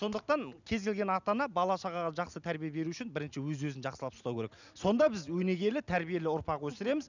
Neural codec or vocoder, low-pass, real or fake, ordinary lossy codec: none; 7.2 kHz; real; none